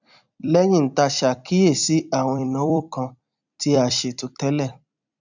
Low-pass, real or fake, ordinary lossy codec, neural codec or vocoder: 7.2 kHz; fake; none; vocoder, 44.1 kHz, 128 mel bands every 256 samples, BigVGAN v2